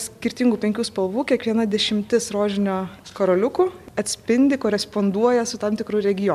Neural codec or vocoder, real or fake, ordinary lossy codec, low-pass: none; real; MP3, 96 kbps; 14.4 kHz